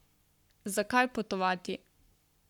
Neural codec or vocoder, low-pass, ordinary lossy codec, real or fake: codec, 44.1 kHz, 7.8 kbps, Pupu-Codec; 19.8 kHz; none; fake